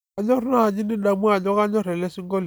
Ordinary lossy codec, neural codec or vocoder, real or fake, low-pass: none; none; real; none